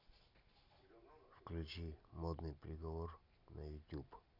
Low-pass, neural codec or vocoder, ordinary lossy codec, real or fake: 5.4 kHz; none; none; real